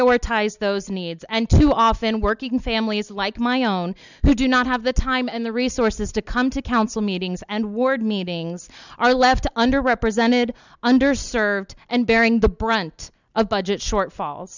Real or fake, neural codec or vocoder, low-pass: real; none; 7.2 kHz